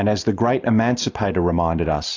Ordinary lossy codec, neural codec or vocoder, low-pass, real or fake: AAC, 48 kbps; none; 7.2 kHz; real